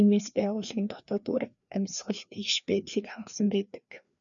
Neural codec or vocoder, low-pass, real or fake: codec, 16 kHz, 2 kbps, FreqCodec, larger model; 7.2 kHz; fake